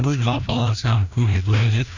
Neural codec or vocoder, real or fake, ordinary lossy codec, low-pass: codec, 16 kHz, 1 kbps, FreqCodec, larger model; fake; none; 7.2 kHz